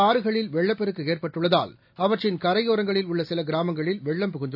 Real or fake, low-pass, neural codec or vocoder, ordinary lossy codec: real; 5.4 kHz; none; AAC, 48 kbps